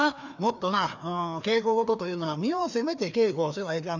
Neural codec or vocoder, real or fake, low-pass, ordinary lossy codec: codec, 16 kHz, 2 kbps, FreqCodec, larger model; fake; 7.2 kHz; none